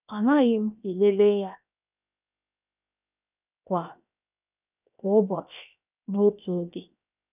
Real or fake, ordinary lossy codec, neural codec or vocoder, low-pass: fake; none; codec, 16 kHz, 0.7 kbps, FocalCodec; 3.6 kHz